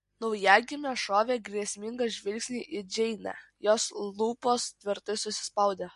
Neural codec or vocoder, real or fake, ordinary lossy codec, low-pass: none; real; MP3, 48 kbps; 14.4 kHz